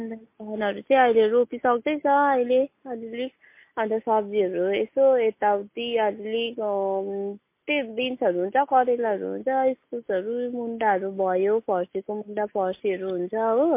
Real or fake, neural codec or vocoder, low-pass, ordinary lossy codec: real; none; 3.6 kHz; MP3, 24 kbps